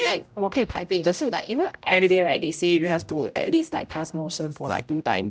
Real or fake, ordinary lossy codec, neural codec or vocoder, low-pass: fake; none; codec, 16 kHz, 0.5 kbps, X-Codec, HuBERT features, trained on general audio; none